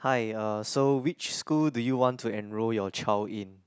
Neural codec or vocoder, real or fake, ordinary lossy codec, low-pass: none; real; none; none